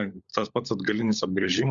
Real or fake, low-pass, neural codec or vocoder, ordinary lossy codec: fake; 7.2 kHz; codec, 16 kHz, 16 kbps, FunCodec, trained on LibriTTS, 50 frames a second; Opus, 64 kbps